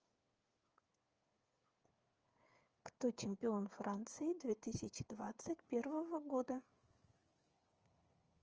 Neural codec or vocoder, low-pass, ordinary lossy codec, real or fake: codec, 16 kHz, 8 kbps, FreqCodec, smaller model; 7.2 kHz; Opus, 24 kbps; fake